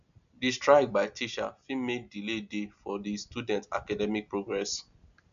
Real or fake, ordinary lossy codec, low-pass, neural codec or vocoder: real; none; 7.2 kHz; none